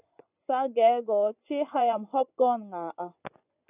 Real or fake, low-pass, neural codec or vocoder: fake; 3.6 kHz; vocoder, 24 kHz, 100 mel bands, Vocos